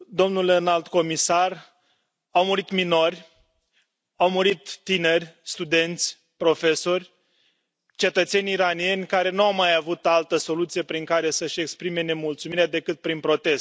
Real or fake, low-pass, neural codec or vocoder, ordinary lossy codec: real; none; none; none